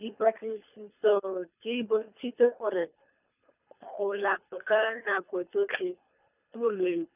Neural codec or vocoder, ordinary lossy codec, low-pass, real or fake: codec, 24 kHz, 3 kbps, HILCodec; none; 3.6 kHz; fake